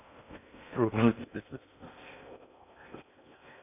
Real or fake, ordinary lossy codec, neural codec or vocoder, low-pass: fake; none; codec, 16 kHz in and 24 kHz out, 0.6 kbps, FocalCodec, streaming, 2048 codes; 3.6 kHz